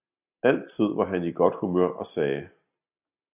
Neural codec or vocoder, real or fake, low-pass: none; real; 3.6 kHz